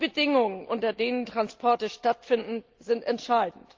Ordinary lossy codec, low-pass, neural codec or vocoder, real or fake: Opus, 32 kbps; 7.2 kHz; none; real